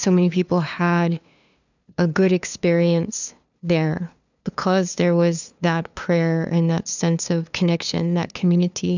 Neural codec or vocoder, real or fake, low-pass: codec, 16 kHz, 2 kbps, FunCodec, trained on LibriTTS, 25 frames a second; fake; 7.2 kHz